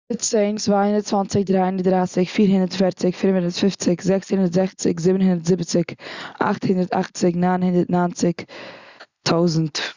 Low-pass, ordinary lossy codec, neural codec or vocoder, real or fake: 7.2 kHz; Opus, 64 kbps; none; real